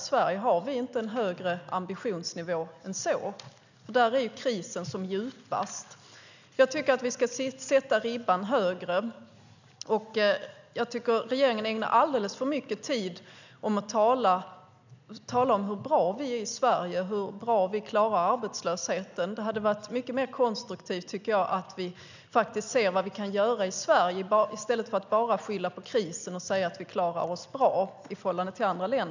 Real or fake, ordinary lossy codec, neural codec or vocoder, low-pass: real; none; none; 7.2 kHz